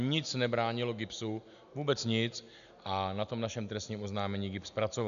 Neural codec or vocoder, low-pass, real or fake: none; 7.2 kHz; real